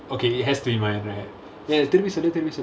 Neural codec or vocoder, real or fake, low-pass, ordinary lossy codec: none; real; none; none